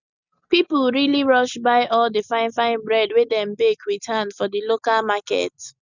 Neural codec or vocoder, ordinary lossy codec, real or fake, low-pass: none; none; real; 7.2 kHz